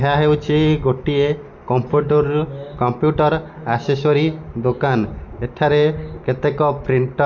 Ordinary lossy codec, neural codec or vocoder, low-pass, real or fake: none; none; 7.2 kHz; real